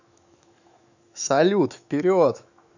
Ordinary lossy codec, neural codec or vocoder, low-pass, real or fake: none; autoencoder, 48 kHz, 128 numbers a frame, DAC-VAE, trained on Japanese speech; 7.2 kHz; fake